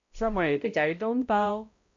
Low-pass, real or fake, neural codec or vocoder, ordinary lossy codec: 7.2 kHz; fake; codec, 16 kHz, 0.5 kbps, X-Codec, HuBERT features, trained on balanced general audio; MP3, 48 kbps